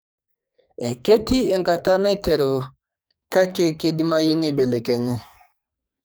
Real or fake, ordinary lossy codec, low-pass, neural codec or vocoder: fake; none; none; codec, 44.1 kHz, 2.6 kbps, SNAC